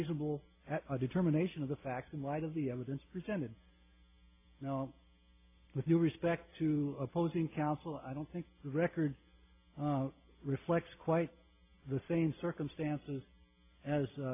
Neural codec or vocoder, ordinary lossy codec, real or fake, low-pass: none; AAC, 24 kbps; real; 3.6 kHz